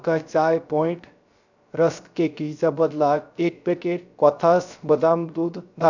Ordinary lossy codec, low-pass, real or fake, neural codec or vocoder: none; 7.2 kHz; fake; codec, 16 kHz, 0.3 kbps, FocalCodec